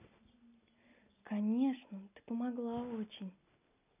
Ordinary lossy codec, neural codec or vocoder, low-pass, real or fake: none; none; 3.6 kHz; real